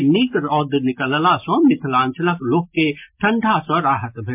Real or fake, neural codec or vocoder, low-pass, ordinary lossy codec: real; none; 3.6 kHz; MP3, 32 kbps